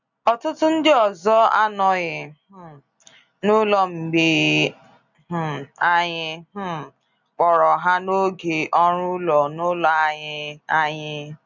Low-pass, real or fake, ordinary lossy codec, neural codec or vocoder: 7.2 kHz; real; none; none